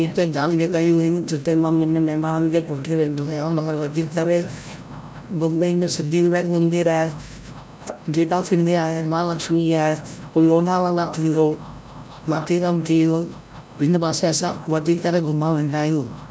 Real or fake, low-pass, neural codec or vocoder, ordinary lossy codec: fake; none; codec, 16 kHz, 0.5 kbps, FreqCodec, larger model; none